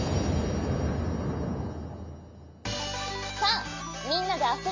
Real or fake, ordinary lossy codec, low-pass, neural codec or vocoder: real; MP3, 64 kbps; 7.2 kHz; none